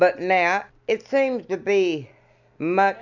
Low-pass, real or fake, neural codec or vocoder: 7.2 kHz; real; none